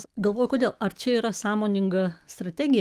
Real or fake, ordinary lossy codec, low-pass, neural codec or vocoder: fake; Opus, 24 kbps; 14.4 kHz; autoencoder, 48 kHz, 128 numbers a frame, DAC-VAE, trained on Japanese speech